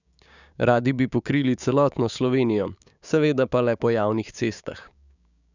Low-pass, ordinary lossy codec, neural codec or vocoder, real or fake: 7.2 kHz; none; codec, 16 kHz, 6 kbps, DAC; fake